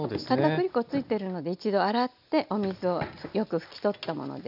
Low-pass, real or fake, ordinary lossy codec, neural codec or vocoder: 5.4 kHz; real; none; none